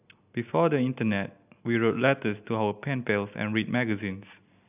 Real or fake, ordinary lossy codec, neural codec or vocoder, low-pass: real; AAC, 32 kbps; none; 3.6 kHz